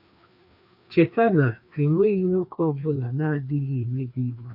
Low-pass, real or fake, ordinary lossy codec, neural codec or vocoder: 5.4 kHz; fake; none; codec, 16 kHz, 2 kbps, FreqCodec, larger model